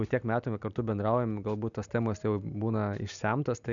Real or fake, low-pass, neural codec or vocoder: real; 7.2 kHz; none